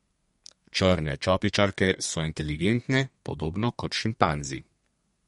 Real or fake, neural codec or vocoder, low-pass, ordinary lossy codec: fake; codec, 32 kHz, 1.9 kbps, SNAC; 14.4 kHz; MP3, 48 kbps